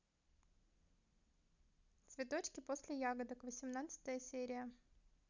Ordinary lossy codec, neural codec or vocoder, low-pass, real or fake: none; none; 7.2 kHz; real